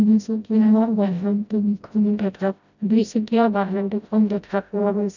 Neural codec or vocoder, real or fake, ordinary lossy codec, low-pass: codec, 16 kHz, 0.5 kbps, FreqCodec, smaller model; fake; none; 7.2 kHz